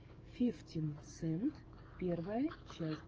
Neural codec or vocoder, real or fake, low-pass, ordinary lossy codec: codec, 44.1 kHz, 7.8 kbps, DAC; fake; 7.2 kHz; Opus, 24 kbps